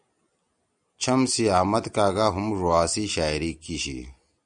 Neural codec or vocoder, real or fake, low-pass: none; real; 9.9 kHz